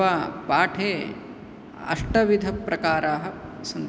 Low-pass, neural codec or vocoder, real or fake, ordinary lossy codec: none; none; real; none